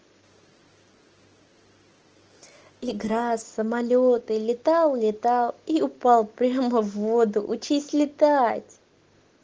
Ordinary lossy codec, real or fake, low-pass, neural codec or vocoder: Opus, 16 kbps; real; 7.2 kHz; none